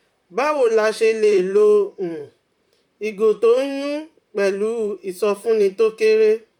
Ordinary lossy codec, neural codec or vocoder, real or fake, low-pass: MP3, 96 kbps; vocoder, 44.1 kHz, 128 mel bands, Pupu-Vocoder; fake; 19.8 kHz